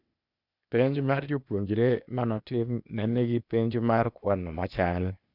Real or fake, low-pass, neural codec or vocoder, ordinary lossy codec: fake; 5.4 kHz; codec, 16 kHz, 0.8 kbps, ZipCodec; none